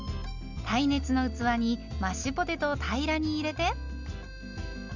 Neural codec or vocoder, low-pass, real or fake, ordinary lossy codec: none; 7.2 kHz; real; none